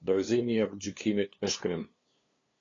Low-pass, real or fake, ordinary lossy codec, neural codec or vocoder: 7.2 kHz; fake; AAC, 32 kbps; codec, 16 kHz, 2 kbps, FunCodec, trained on LibriTTS, 25 frames a second